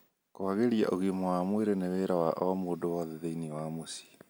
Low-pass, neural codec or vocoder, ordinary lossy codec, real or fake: none; none; none; real